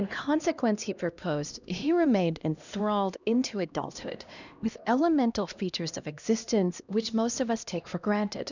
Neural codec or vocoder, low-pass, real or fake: codec, 16 kHz, 1 kbps, X-Codec, HuBERT features, trained on LibriSpeech; 7.2 kHz; fake